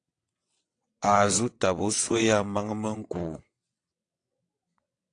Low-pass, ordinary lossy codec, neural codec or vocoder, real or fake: 9.9 kHz; AAC, 48 kbps; vocoder, 22.05 kHz, 80 mel bands, WaveNeXt; fake